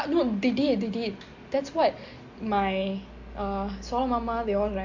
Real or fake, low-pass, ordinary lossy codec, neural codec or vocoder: real; 7.2 kHz; MP3, 48 kbps; none